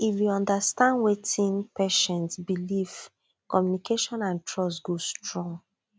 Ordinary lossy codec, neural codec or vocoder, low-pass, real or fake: none; none; none; real